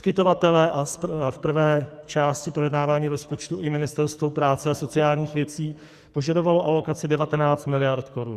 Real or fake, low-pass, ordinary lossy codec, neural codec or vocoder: fake; 14.4 kHz; Opus, 64 kbps; codec, 44.1 kHz, 2.6 kbps, SNAC